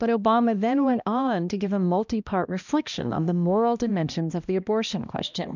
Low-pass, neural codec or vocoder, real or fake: 7.2 kHz; codec, 16 kHz, 1 kbps, X-Codec, HuBERT features, trained on balanced general audio; fake